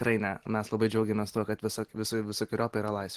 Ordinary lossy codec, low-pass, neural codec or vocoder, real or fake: Opus, 16 kbps; 14.4 kHz; none; real